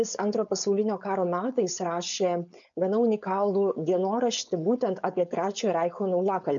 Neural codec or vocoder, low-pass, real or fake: codec, 16 kHz, 4.8 kbps, FACodec; 7.2 kHz; fake